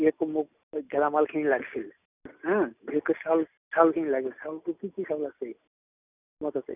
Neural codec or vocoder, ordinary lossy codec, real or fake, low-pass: none; none; real; 3.6 kHz